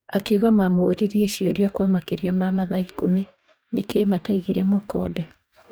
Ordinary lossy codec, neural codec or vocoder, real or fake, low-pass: none; codec, 44.1 kHz, 2.6 kbps, DAC; fake; none